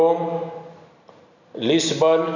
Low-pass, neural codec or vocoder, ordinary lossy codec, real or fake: 7.2 kHz; none; none; real